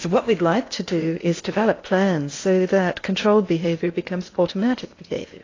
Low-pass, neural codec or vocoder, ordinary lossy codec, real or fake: 7.2 kHz; codec, 16 kHz in and 24 kHz out, 0.8 kbps, FocalCodec, streaming, 65536 codes; AAC, 32 kbps; fake